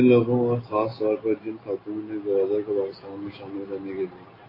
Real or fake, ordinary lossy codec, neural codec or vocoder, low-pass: real; AAC, 24 kbps; none; 5.4 kHz